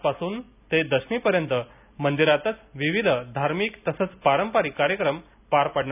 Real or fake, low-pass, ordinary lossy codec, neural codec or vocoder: real; 3.6 kHz; none; none